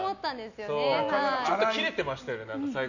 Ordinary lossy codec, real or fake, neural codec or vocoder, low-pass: none; real; none; 7.2 kHz